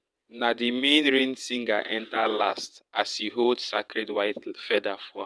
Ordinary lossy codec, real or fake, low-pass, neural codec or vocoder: none; fake; none; vocoder, 22.05 kHz, 80 mel bands, WaveNeXt